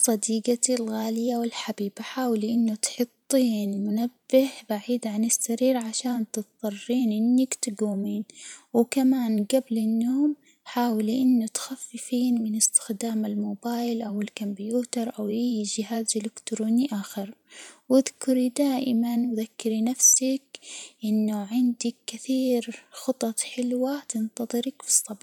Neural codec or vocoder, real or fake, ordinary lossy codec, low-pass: vocoder, 44.1 kHz, 128 mel bands every 512 samples, BigVGAN v2; fake; none; 19.8 kHz